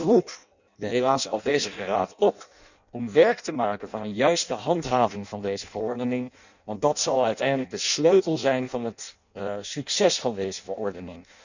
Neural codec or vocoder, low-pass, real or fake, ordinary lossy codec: codec, 16 kHz in and 24 kHz out, 0.6 kbps, FireRedTTS-2 codec; 7.2 kHz; fake; none